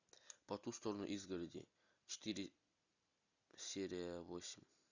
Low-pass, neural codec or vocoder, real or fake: 7.2 kHz; none; real